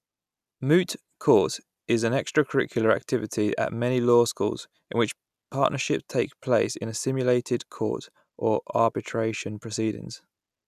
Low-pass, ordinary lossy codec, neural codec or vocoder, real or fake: 14.4 kHz; none; none; real